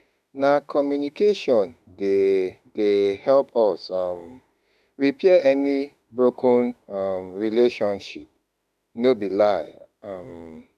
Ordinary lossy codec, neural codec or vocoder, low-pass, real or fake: none; autoencoder, 48 kHz, 32 numbers a frame, DAC-VAE, trained on Japanese speech; 14.4 kHz; fake